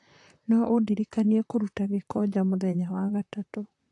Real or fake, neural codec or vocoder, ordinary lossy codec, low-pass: fake; vocoder, 44.1 kHz, 128 mel bands, Pupu-Vocoder; AAC, 48 kbps; 10.8 kHz